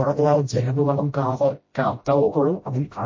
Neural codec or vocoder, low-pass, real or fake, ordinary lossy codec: codec, 16 kHz, 0.5 kbps, FreqCodec, smaller model; 7.2 kHz; fake; MP3, 32 kbps